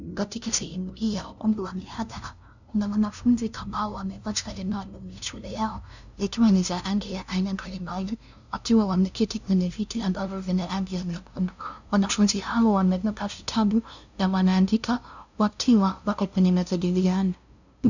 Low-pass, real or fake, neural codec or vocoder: 7.2 kHz; fake; codec, 16 kHz, 0.5 kbps, FunCodec, trained on LibriTTS, 25 frames a second